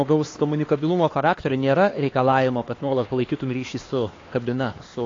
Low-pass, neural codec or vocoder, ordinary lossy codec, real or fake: 7.2 kHz; codec, 16 kHz, 2 kbps, X-Codec, HuBERT features, trained on LibriSpeech; AAC, 32 kbps; fake